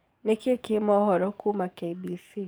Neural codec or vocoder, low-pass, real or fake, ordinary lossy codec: codec, 44.1 kHz, 7.8 kbps, DAC; none; fake; none